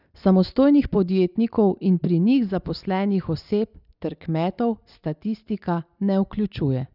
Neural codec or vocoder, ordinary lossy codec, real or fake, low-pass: none; none; real; 5.4 kHz